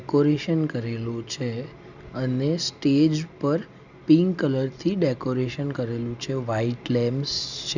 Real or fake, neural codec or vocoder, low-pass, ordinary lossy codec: real; none; 7.2 kHz; none